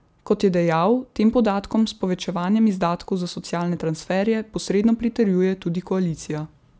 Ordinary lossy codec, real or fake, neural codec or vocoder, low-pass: none; real; none; none